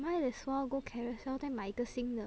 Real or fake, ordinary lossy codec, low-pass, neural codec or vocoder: real; none; none; none